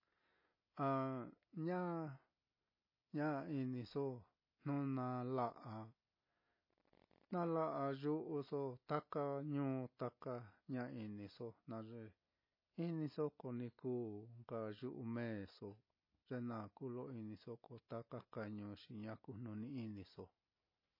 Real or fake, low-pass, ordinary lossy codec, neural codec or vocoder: real; 5.4 kHz; MP3, 24 kbps; none